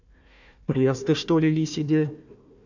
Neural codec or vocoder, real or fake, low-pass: codec, 16 kHz, 1 kbps, FunCodec, trained on Chinese and English, 50 frames a second; fake; 7.2 kHz